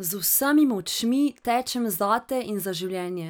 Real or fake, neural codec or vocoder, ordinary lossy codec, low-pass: real; none; none; none